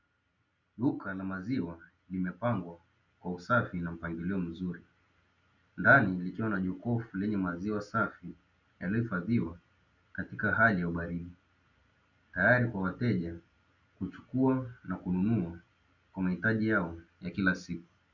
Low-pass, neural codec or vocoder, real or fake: 7.2 kHz; none; real